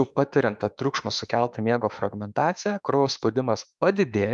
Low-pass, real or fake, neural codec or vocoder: 10.8 kHz; fake; autoencoder, 48 kHz, 32 numbers a frame, DAC-VAE, trained on Japanese speech